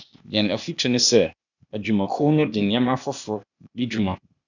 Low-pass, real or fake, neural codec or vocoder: 7.2 kHz; fake; codec, 16 kHz, 0.8 kbps, ZipCodec